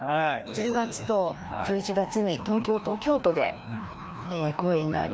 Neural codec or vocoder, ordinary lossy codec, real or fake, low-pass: codec, 16 kHz, 1 kbps, FreqCodec, larger model; none; fake; none